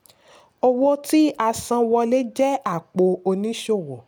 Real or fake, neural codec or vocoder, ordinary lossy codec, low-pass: real; none; none; none